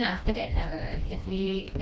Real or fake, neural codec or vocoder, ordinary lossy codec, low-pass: fake; codec, 16 kHz, 1 kbps, FreqCodec, smaller model; none; none